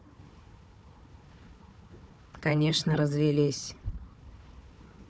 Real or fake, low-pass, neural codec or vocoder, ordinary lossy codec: fake; none; codec, 16 kHz, 4 kbps, FunCodec, trained on Chinese and English, 50 frames a second; none